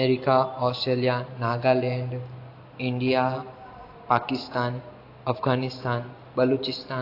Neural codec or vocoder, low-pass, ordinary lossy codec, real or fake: none; 5.4 kHz; AAC, 32 kbps; real